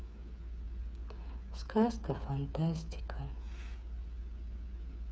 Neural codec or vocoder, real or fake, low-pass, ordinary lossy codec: codec, 16 kHz, 8 kbps, FreqCodec, smaller model; fake; none; none